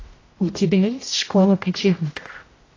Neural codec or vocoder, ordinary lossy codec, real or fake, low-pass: codec, 16 kHz, 0.5 kbps, X-Codec, HuBERT features, trained on general audio; AAC, 32 kbps; fake; 7.2 kHz